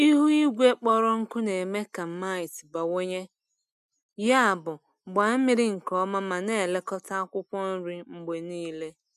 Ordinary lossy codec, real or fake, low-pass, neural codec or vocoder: none; real; 14.4 kHz; none